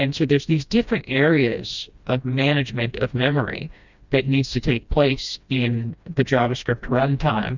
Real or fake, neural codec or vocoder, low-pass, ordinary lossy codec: fake; codec, 16 kHz, 1 kbps, FreqCodec, smaller model; 7.2 kHz; Opus, 64 kbps